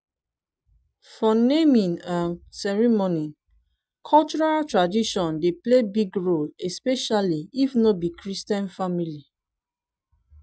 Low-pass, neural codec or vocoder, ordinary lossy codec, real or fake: none; none; none; real